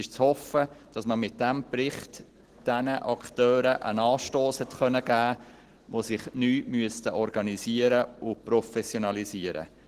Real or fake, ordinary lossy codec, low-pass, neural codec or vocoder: real; Opus, 16 kbps; 14.4 kHz; none